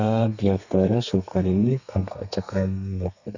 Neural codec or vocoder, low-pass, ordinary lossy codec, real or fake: codec, 32 kHz, 1.9 kbps, SNAC; 7.2 kHz; none; fake